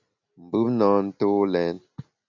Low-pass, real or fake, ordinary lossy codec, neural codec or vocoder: 7.2 kHz; real; MP3, 64 kbps; none